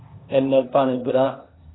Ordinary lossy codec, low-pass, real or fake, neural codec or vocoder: AAC, 16 kbps; 7.2 kHz; fake; codec, 16 kHz, 0.8 kbps, ZipCodec